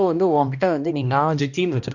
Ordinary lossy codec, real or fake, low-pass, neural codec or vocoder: none; fake; 7.2 kHz; codec, 16 kHz, 1 kbps, X-Codec, HuBERT features, trained on balanced general audio